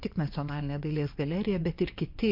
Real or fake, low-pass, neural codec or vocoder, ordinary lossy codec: fake; 5.4 kHz; vocoder, 24 kHz, 100 mel bands, Vocos; MP3, 32 kbps